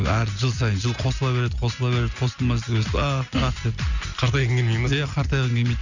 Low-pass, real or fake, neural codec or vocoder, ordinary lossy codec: 7.2 kHz; real; none; none